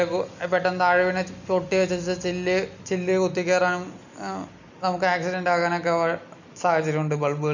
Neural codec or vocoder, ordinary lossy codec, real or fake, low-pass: none; none; real; 7.2 kHz